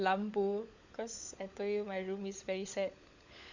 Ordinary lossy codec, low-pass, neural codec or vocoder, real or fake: Opus, 64 kbps; 7.2 kHz; codec, 16 kHz, 16 kbps, FunCodec, trained on LibriTTS, 50 frames a second; fake